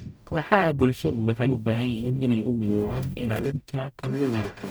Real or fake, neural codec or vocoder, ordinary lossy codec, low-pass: fake; codec, 44.1 kHz, 0.9 kbps, DAC; none; none